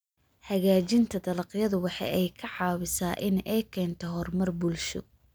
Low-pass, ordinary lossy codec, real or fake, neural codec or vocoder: none; none; real; none